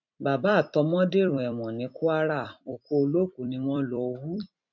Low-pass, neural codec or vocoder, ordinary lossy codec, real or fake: 7.2 kHz; vocoder, 44.1 kHz, 128 mel bands every 256 samples, BigVGAN v2; none; fake